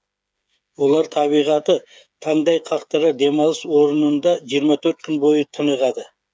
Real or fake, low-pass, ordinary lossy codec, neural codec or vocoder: fake; none; none; codec, 16 kHz, 8 kbps, FreqCodec, smaller model